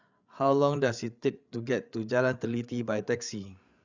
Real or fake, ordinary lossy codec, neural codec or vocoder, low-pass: fake; Opus, 64 kbps; codec, 16 kHz, 8 kbps, FreqCodec, larger model; 7.2 kHz